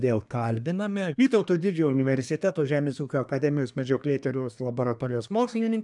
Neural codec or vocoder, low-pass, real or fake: codec, 24 kHz, 1 kbps, SNAC; 10.8 kHz; fake